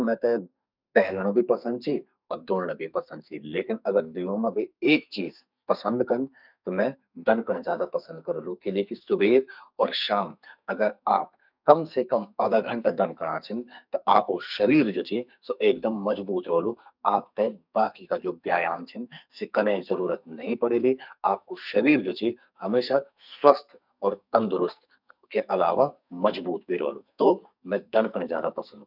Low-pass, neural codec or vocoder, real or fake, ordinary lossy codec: 5.4 kHz; codec, 44.1 kHz, 2.6 kbps, SNAC; fake; none